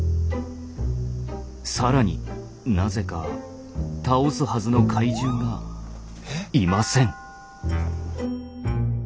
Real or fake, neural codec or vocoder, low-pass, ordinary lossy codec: real; none; none; none